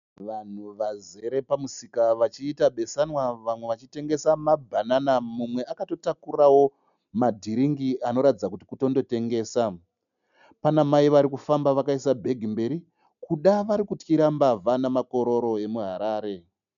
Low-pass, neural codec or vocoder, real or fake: 7.2 kHz; none; real